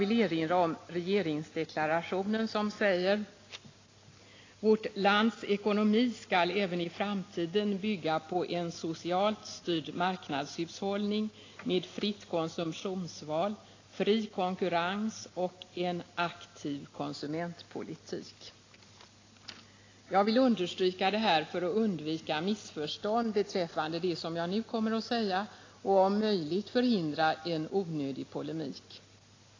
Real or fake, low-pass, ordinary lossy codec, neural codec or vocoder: real; 7.2 kHz; AAC, 32 kbps; none